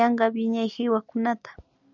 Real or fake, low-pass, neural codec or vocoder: real; 7.2 kHz; none